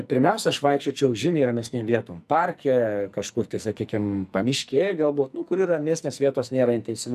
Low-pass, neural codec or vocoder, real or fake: 14.4 kHz; codec, 44.1 kHz, 2.6 kbps, SNAC; fake